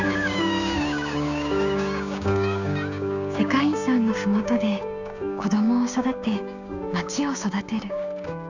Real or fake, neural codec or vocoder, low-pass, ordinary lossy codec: fake; codec, 16 kHz, 6 kbps, DAC; 7.2 kHz; none